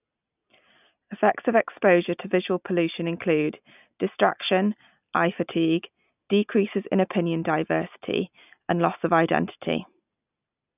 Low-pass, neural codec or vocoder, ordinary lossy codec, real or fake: 3.6 kHz; none; none; real